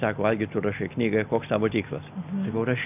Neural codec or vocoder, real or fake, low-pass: none; real; 3.6 kHz